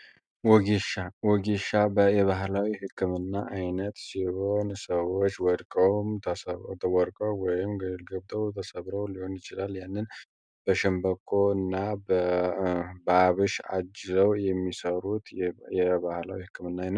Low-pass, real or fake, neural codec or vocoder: 9.9 kHz; real; none